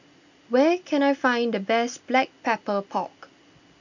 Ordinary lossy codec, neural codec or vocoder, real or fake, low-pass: none; none; real; 7.2 kHz